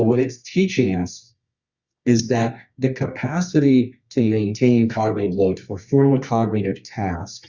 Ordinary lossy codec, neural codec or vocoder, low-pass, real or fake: Opus, 64 kbps; codec, 24 kHz, 0.9 kbps, WavTokenizer, medium music audio release; 7.2 kHz; fake